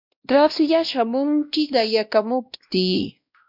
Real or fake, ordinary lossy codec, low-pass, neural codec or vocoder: fake; MP3, 48 kbps; 5.4 kHz; codec, 16 kHz, 1 kbps, X-Codec, WavLM features, trained on Multilingual LibriSpeech